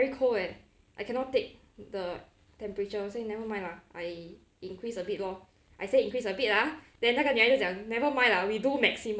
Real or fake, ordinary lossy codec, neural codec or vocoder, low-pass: real; none; none; none